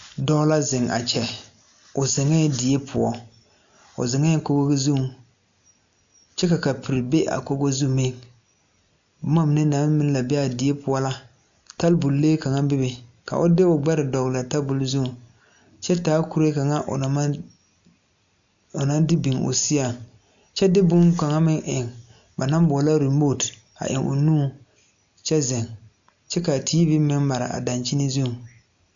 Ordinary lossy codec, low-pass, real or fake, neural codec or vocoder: MP3, 64 kbps; 7.2 kHz; real; none